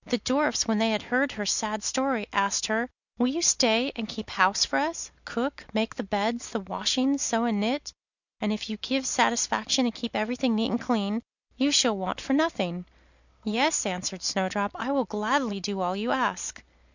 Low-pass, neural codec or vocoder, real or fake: 7.2 kHz; none; real